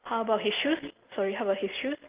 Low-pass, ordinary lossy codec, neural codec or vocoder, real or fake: 3.6 kHz; Opus, 24 kbps; none; real